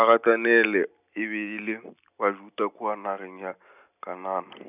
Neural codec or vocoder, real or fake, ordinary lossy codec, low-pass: none; real; none; 3.6 kHz